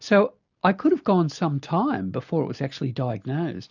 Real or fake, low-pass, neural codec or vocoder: real; 7.2 kHz; none